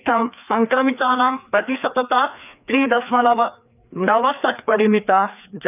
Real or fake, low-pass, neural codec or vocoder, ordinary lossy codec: fake; 3.6 kHz; codec, 16 kHz, 2 kbps, FreqCodec, larger model; none